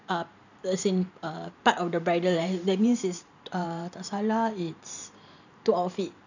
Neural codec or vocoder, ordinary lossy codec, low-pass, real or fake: vocoder, 44.1 kHz, 128 mel bands every 512 samples, BigVGAN v2; none; 7.2 kHz; fake